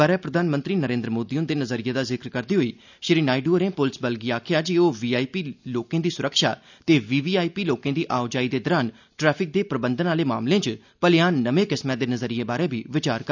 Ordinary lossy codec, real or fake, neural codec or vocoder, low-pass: none; real; none; 7.2 kHz